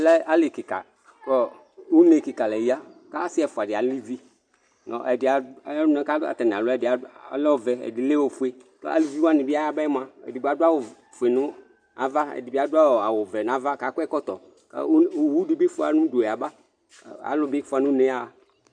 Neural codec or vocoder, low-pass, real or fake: none; 9.9 kHz; real